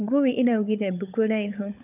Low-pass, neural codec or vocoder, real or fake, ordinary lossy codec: 3.6 kHz; codec, 16 kHz, 16 kbps, FunCodec, trained on LibriTTS, 50 frames a second; fake; none